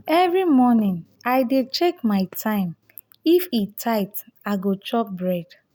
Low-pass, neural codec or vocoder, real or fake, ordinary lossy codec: none; none; real; none